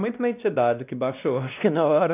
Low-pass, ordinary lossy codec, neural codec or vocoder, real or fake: 3.6 kHz; none; codec, 16 kHz, 1 kbps, X-Codec, WavLM features, trained on Multilingual LibriSpeech; fake